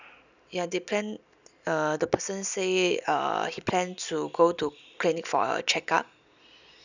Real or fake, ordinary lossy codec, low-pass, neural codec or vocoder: real; none; 7.2 kHz; none